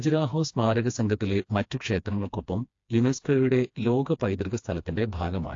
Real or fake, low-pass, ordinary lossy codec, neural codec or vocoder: fake; 7.2 kHz; AAC, 48 kbps; codec, 16 kHz, 2 kbps, FreqCodec, smaller model